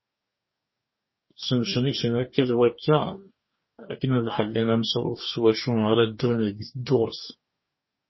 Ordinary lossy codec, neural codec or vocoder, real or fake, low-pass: MP3, 24 kbps; codec, 44.1 kHz, 2.6 kbps, DAC; fake; 7.2 kHz